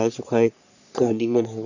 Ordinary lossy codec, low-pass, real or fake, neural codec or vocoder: none; 7.2 kHz; fake; codec, 44.1 kHz, 3.4 kbps, Pupu-Codec